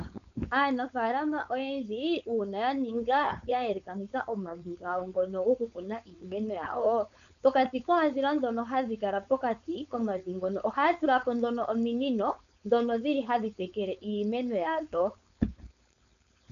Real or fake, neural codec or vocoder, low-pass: fake; codec, 16 kHz, 4.8 kbps, FACodec; 7.2 kHz